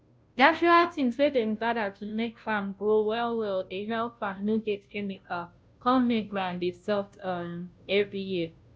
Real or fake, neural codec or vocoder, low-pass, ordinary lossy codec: fake; codec, 16 kHz, 0.5 kbps, FunCodec, trained on Chinese and English, 25 frames a second; none; none